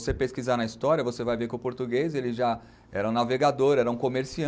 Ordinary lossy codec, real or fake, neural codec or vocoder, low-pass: none; real; none; none